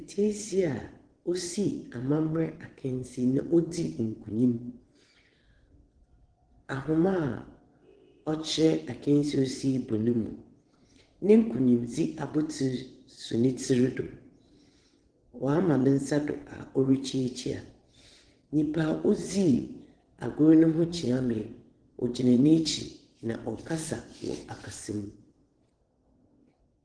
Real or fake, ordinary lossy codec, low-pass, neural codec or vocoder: fake; Opus, 16 kbps; 9.9 kHz; vocoder, 22.05 kHz, 80 mel bands, WaveNeXt